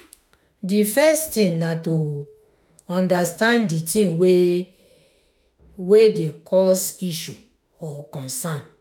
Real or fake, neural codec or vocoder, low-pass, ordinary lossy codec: fake; autoencoder, 48 kHz, 32 numbers a frame, DAC-VAE, trained on Japanese speech; none; none